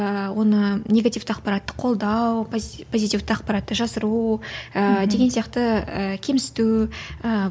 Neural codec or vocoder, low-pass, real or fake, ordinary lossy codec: none; none; real; none